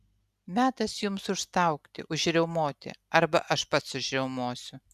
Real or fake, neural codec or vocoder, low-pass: real; none; 14.4 kHz